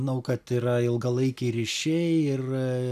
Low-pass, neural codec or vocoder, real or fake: 14.4 kHz; none; real